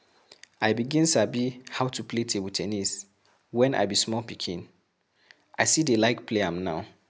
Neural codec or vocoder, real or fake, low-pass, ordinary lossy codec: none; real; none; none